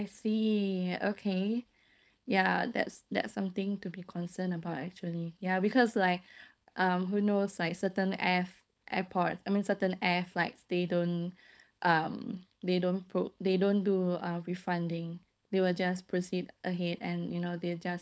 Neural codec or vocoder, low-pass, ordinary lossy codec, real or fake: codec, 16 kHz, 4.8 kbps, FACodec; none; none; fake